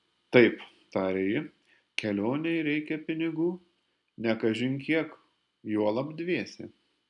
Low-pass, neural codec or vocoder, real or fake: 10.8 kHz; none; real